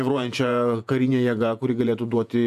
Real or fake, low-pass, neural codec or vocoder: fake; 14.4 kHz; vocoder, 48 kHz, 128 mel bands, Vocos